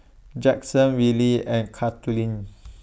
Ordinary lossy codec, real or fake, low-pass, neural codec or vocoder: none; real; none; none